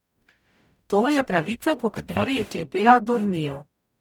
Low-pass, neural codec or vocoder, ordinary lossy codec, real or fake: 19.8 kHz; codec, 44.1 kHz, 0.9 kbps, DAC; none; fake